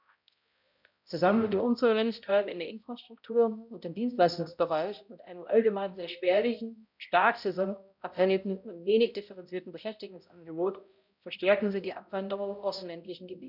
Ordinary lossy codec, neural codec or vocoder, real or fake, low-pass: none; codec, 16 kHz, 0.5 kbps, X-Codec, HuBERT features, trained on balanced general audio; fake; 5.4 kHz